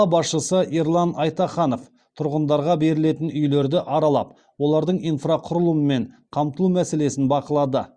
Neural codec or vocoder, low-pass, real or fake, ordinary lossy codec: none; 9.9 kHz; real; Opus, 64 kbps